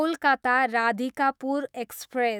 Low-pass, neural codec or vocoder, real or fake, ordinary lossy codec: none; autoencoder, 48 kHz, 128 numbers a frame, DAC-VAE, trained on Japanese speech; fake; none